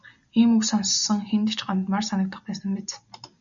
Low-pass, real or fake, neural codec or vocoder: 7.2 kHz; real; none